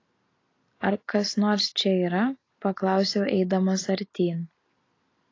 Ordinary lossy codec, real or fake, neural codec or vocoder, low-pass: AAC, 32 kbps; real; none; 7.2 kHz